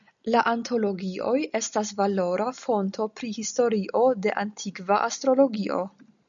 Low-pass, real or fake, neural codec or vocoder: 7.2 kHz; real; none